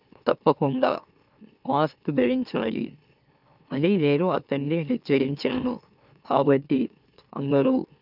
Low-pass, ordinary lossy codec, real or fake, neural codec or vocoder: 5.4 kHz; none; fake; autoencoder, 44.1 kHz, a latent of 192 numbers a frame, MeloTTS